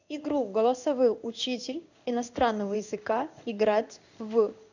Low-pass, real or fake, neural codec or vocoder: 7.2 kHz; fake; codec, 16 kHz in and 24 kHz out, 1 kbps, XY-Tokenizer